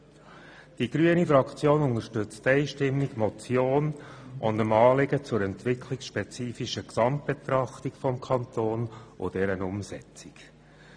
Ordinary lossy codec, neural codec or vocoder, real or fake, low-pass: none; none; real; 9.9 kHz